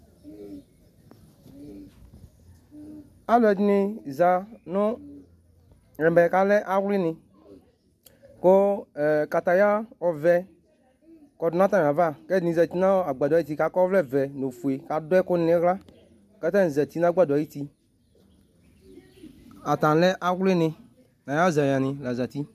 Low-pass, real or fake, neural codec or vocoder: 14.4 kHz; real; none